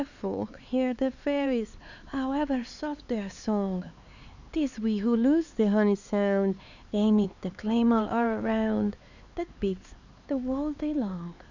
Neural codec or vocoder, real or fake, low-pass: codec, 16 kHz, 4 kbps, X-Codec, HuBERT features, trained on LibriSpeech; fake; 7.2 kHz